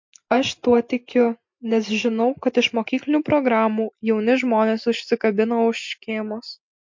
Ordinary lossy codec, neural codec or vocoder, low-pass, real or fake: MP3, 48 kbps; none; 7.2 kHz; real